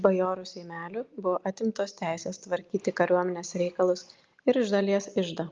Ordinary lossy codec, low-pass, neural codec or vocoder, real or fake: Opus, 32 kbps; 7.2 kHz; none; real